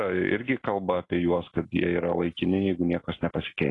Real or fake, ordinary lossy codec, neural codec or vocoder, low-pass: real; AAC, 32 kbps; none; 10.8 kHz